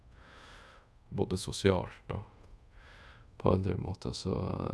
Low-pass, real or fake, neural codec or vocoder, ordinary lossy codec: none; fake; codec, 24 kHz, 0.5 kbps, DualCodec; none